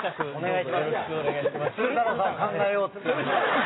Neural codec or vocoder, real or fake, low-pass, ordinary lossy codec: none; real; 7.2 kHz; AAC, 16 kbps